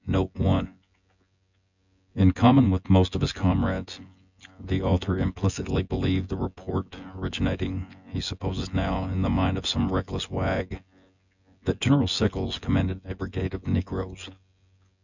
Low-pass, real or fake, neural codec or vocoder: 7.2 kHz; fake; vocoder, 24 kHz, 100 mel bands, Vocos